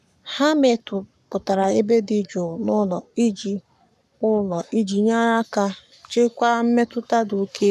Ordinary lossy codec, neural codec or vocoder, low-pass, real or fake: none; codec, 44.1 kHz, 7.8 kbps, Pupu-Codec; 14.4 kHz; fake